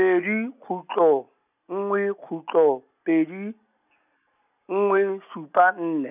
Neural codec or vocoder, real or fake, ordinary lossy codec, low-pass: none; real; none; 3.6 kHz